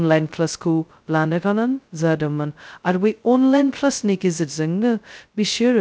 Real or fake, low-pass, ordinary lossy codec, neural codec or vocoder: fake; none; none; codec, 16 kHz, 0.2 kbps, FocalCodec